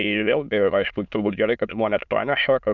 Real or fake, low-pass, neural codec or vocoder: fake; 7.2 kHz; autoencoder, 22.05 kHz, a latent of 192 numbers a frame, VITS, trained on many speakers